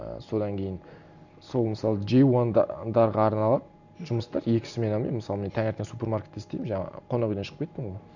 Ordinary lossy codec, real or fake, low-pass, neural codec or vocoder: none; real; 7.2 kHz; none